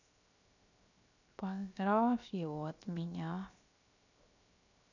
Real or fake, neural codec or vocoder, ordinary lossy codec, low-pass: fake; codec, 16 kHz, 0.7 kbps, FocalCodec; none; 7.2 kHz